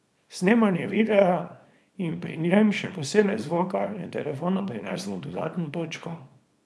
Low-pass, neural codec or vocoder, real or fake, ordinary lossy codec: none; codec, 24 kHz, 0.9 kbps, WavTokenizer, small release; fake; none